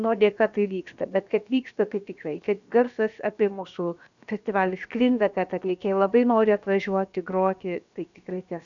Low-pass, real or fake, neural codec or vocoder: 7.2 kHz; fake; codec, 16 kHz, about 1 kbps, DyCAST, with the encoder's durations